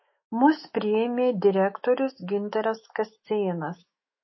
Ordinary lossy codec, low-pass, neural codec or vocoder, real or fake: MP3, 24 kbps; 7.2 kHz; autoencoder, 48 kHz, 128 numbers a frame, DAC-VAE, trained on Japanese speech; fake